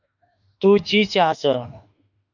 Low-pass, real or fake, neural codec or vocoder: 7.2 kHz; fake; autoencoder, 48 kHz, 32 numbers a frame, DAC-VAE, trained on Japanese speech